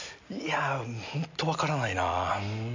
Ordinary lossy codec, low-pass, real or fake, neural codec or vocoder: AAC, 48 kbps; 7.2 kHz; real; none